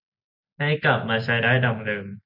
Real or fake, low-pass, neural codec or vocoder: real; 5.4 kHz; none